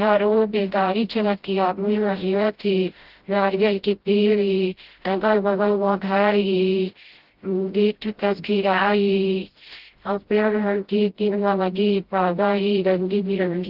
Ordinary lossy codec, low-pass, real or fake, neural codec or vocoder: Opus, 16 kbps; 5.4 kHz; fake; codec, 16 kHz, 0.5 kbps, FreqCodec, smaller model